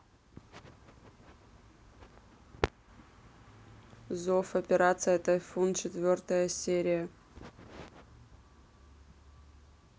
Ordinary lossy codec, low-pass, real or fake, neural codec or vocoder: none; none; real; none